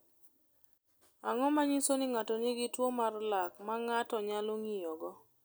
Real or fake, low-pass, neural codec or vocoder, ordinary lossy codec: real; none; none; none